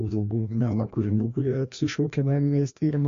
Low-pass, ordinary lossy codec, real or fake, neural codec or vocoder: 7.2 kHz; AAC, 48 kbps; fake; codec, 16 kHz, 1 kbps, FreqCodec, larger model